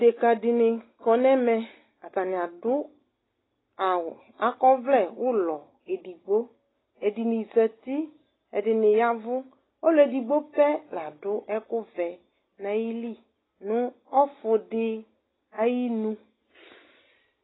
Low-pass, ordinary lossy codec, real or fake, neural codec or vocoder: 7.2 kHz; AAC, 16 kbps; real; none